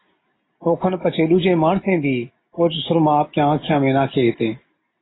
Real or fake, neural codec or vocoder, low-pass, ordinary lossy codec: real; none; 7.2 kHz; AAC, 16 kbps